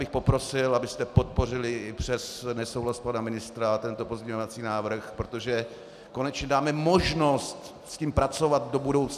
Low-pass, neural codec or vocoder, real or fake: 14.4 kHz; none; real